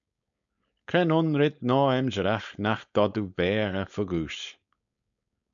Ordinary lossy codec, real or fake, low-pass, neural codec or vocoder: MP3, 96 kbps; fake; 7.2 kHz; codec, 16 kHz, 4.8 kbps, FACodec